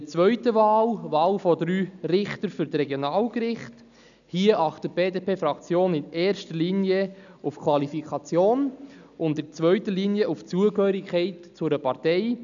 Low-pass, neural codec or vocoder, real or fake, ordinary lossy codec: 7.2 kHz; none; real; none